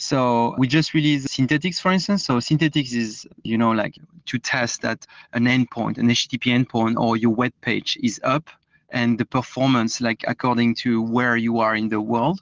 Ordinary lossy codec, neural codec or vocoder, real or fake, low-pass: Opus, 16 kbps; none; real; 7.2 kHz